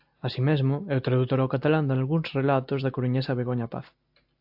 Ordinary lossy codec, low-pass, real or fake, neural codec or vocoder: AAC, 48 kbps; 5.4 kHz; real; none